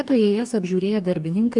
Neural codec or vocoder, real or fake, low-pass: codec, 44.1 kHz, 2.6 kbps, DAC; fake; 10.8 kHz